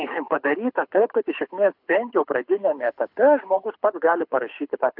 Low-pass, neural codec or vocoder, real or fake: 5.4 kHz; codec, 24 kHz, 6 kbps, HILCodec; fake